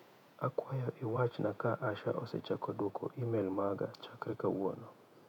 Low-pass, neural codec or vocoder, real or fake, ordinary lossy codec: 19.8 kHz; vocoder, 48 kHz, 128 mel bands, Vocos; fake; none